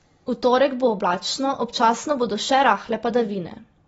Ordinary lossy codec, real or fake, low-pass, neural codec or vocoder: AAC, 24 kbps; real; 19.8 kHz; none